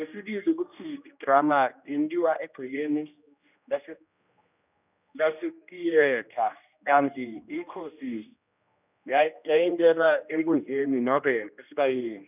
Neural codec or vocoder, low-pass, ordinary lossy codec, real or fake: codec, 16 kHz, 1 kbps, X-Codec, HuBERT features, trained on general audio; 3.6 kHz; none; fake